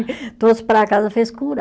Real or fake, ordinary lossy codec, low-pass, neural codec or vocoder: real; none; none; none